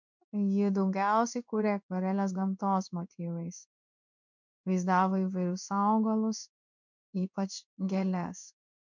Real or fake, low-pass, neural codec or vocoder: fake; 7.2 kHz; codec, 16 kHz in and 24 kHz out, 1 kbps, XY-Tokenizer